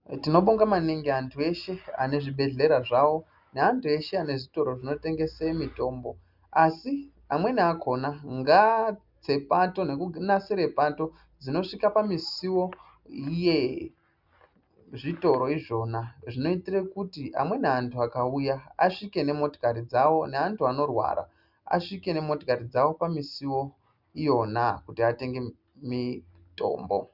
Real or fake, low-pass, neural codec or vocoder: real; 5.4 kHz; none